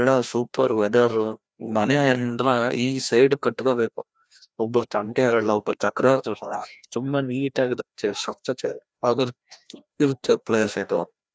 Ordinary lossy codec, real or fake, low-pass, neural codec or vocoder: none; fake; none; codec, 16 kHz, 1 kbps, FreqCodec, larger model